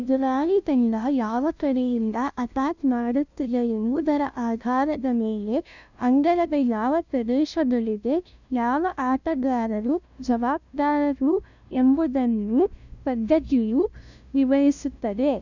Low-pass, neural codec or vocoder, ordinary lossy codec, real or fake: 7.2 kHz; codec, 16 kHz, 0.5 kbps, FunCodec, trained on LibriTTS, 25 frames a second; none; fake